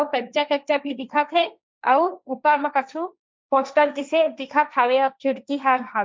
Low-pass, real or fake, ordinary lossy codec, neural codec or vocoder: none; fake; none; codec, 16 kHz, 1.1 kbps, Voila-Tokenizer